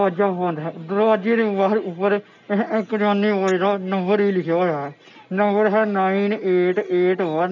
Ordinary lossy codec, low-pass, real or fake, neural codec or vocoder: none; 7.2 kHz; real; none